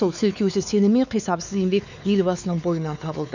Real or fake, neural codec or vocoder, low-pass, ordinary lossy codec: fake; codec, 16 kHz, 4 kbps, X-Codec, WavLM features, trained on Multilingual LibriSpeech; 7.2 kHz; none